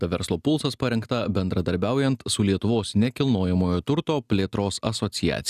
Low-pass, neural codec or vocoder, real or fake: 14.4 kHz; none; real